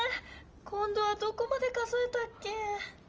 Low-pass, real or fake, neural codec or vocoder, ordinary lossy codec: 7.2 kHz; real; none; Opus, 24 kbps